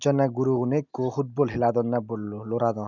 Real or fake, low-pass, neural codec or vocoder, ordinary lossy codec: real; 7.2 kHz; none; none